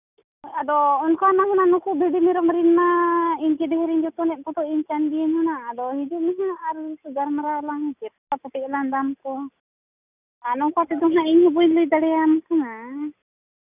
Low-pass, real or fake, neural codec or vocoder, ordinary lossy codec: 3.6 kHz; real; none; none